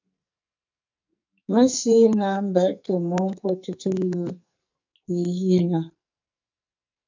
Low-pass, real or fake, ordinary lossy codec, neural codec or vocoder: 7.2 kHz; fake; MP3, 64 kbps; codec, 44.1 kHz, 2.6 kbps, SNAC